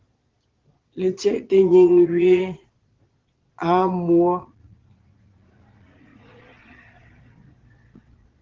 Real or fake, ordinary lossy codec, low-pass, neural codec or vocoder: fake; Opus, 16 kbps; 7.2 kHz; vocoder, 22.05 kHz, 80 mel bands, Vocos